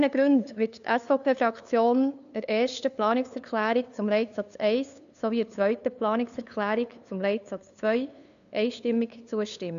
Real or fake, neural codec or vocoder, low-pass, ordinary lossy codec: fake; codec, 16 kHz, 2 kbps, FunCodec, trained on LibriTTS, 25 frames a second; 7.2 kHz; none